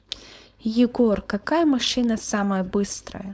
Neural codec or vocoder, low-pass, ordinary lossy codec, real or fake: codec, 16 kHz, 4.8 kbps, FACodec; none; none; fake